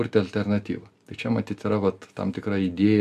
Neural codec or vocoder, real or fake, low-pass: none; real; 14.4 kHz